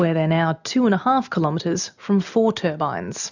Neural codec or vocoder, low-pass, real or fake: none; 7.2 kHz; real